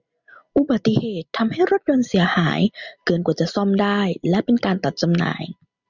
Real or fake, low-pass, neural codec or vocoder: real; 7.2 kHz; none